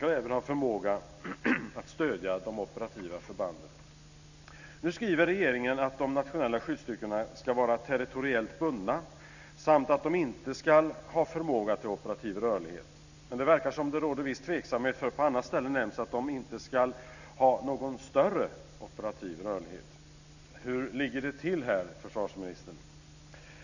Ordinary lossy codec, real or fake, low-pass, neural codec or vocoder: none; real; 7.2 kHz; none